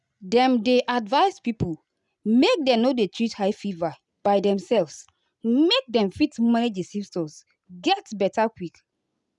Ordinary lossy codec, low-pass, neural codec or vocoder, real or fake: none; 10.8 kHz; none; real